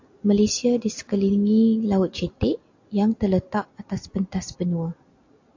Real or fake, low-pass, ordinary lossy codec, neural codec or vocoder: real; 7.2 kHz; AAC, 48 kbps; none